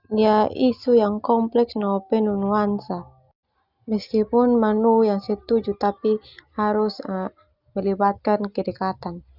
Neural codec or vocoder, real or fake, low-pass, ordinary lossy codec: none; real; 5.4 kHz; Opus, 64 kbps